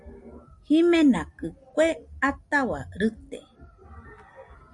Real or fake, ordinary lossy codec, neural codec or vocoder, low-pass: real; Opus, 64 kbps; none; 10.8 kHz